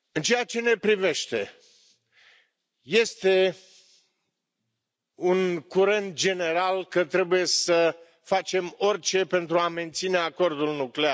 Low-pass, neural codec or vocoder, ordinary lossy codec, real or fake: none; none; none; real